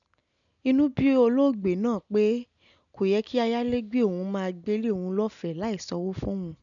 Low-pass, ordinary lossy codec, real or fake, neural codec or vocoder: 7.2 kHz; none; real; none